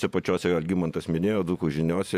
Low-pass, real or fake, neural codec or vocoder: 14.4 kHz; fake; vocoder, 48 kHz, 128 mel bands, Vocos